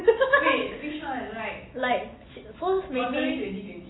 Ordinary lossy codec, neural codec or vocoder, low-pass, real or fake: AAC, 16 kbps; none; 7.2 kHz; real